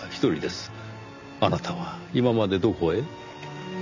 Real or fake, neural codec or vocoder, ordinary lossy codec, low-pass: real; none; none; 7.2 kHz